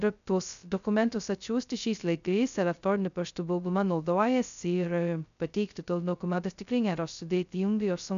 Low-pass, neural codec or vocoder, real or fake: 7.2 kHz; codec, 16 kHz, 0.2 kbps, FocalCodec; fake